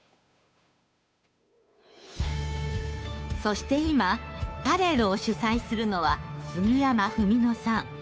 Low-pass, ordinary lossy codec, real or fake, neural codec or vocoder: none; none; fake; codec, 16 kHz, 2 kbps, FunCodec, trained on Chinese and English, 25 frames a second